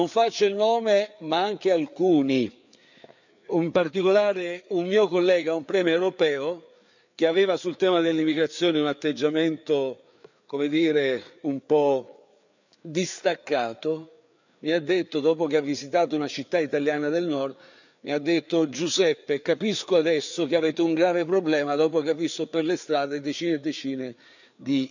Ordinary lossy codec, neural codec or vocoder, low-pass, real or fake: none; codec, 16 kHz, 4 kbps, FreqCodec, larger model; 7.2 kHz; fake